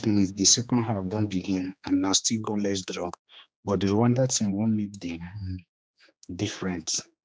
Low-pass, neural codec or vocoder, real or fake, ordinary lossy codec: none; codec, 16 kHz, 2 kbps, X-Codec, HuBERT features, trained on general audio; fake; none